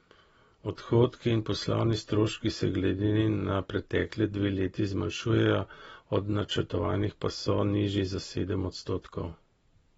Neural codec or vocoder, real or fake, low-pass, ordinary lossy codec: none; real; 9.9 kHz; AAC, 24 kbps